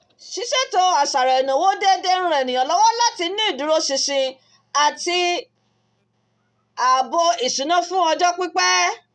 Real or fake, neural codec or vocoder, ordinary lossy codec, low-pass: real; none; none; none